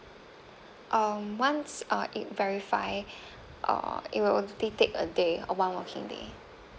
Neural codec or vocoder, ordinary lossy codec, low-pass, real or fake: none; none; none; real